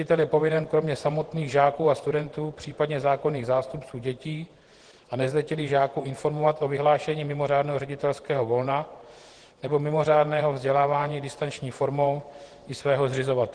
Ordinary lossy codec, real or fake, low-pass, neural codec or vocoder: Opus, 16 kbps; fake; 9.9 kHz; vocoder, 48 kHz, 128 mel bands, Vocos